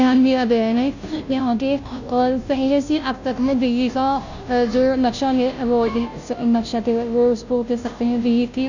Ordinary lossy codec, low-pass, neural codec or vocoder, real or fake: none; 7.2 kHz; codec, 16 kHz, 0.5 kbps, FunCodec, trained on Chinese and English, 25 frames a second; fake